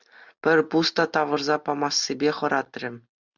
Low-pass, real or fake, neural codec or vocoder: 7.2 kHz; real; none